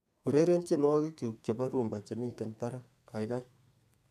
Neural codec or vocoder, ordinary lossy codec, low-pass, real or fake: codec, 32 kHz, 1.9 kbps, SNAC; none; 14.4 kHz; fake